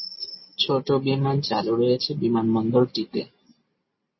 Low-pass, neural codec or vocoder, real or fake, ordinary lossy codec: 7.2 kHz; none; real; MP3, 24 kbps